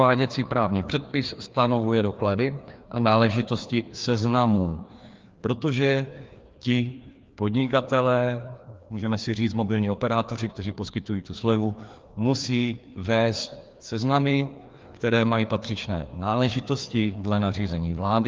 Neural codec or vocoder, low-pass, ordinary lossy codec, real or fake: codec, 16 kHz, 2 kbps, FreqCodec, larger model; 7.2 kHz; Opus, 32 kbps; fake